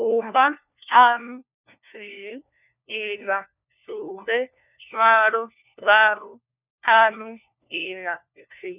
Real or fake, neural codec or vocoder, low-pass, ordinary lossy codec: fake; codec, 16 kHz, 1 kbps, FunCodec, trained on LibriTTS, 50 frames a second; 3.6 kHz; none